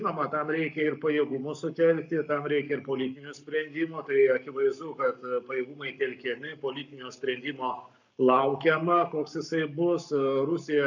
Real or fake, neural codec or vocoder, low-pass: fake; codec, 44.1 kHz, 7.8 kbps, Pupu-Codec; 7.2 kHz